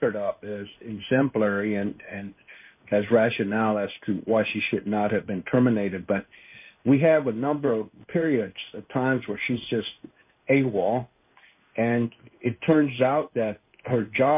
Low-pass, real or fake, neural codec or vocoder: 3.6 kHz; fake; codec, 16 kHz in and 24 kHz out, 1 kbps, XY-Tokenizer